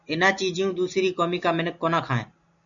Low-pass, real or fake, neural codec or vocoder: 7.2 kHz; real; none